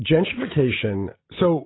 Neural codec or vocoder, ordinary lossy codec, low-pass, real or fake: none; AAC, 16 kbps; 7.2 kHz; real